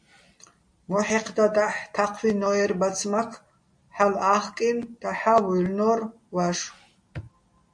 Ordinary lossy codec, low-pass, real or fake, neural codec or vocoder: MP3, 48 kbps; 9.9 kHz; real; none